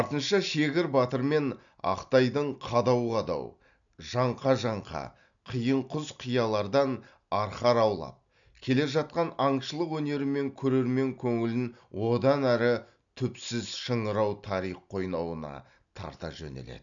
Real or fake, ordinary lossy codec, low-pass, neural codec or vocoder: real; none; 7.2 kHz; none